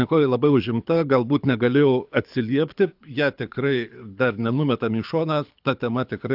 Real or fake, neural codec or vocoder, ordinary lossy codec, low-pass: fake; codec, 24 kHz, 6 kbps, HILCodec; AAC, 48 kbps; 5.4 kHz